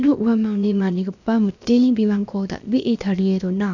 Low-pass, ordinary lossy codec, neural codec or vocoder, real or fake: 7.2 kHz; none; codec, 16 kHz, about 1 kbps, DyCAST, with the encoder's durations; fake